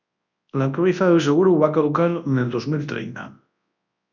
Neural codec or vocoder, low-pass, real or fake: codec, 24 kHz, 0.9 kbps, WavTokenizer, large speech release; 7.2 kHz; fake